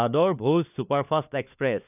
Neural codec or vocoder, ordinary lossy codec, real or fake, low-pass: codec, 16 kHz, 4 kbps, FunCodec, trained on LibriTTS, 50 frames a second; none; fake; 3.6 kHz